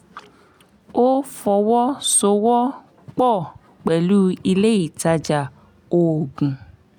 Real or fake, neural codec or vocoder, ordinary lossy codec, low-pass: real; none; none; 19.8 kHz